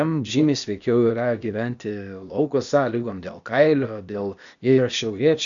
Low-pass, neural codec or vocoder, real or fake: 7.2 kHz; codec, 16 kHz, 0.8 kbps, ZipCodec; fake